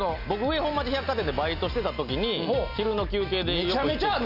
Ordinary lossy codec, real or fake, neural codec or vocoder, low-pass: Opus, 64 kbps; real; none; 5.4 kHz